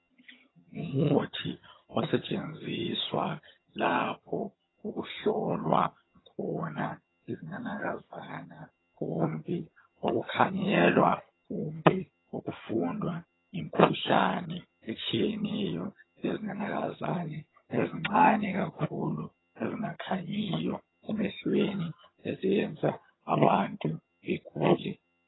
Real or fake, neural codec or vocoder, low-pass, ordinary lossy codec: fake; vocoder, 22.05 kHz, 80 mel bands, HiFi-GAN; 7.2 kHz; AAC, 16 kbps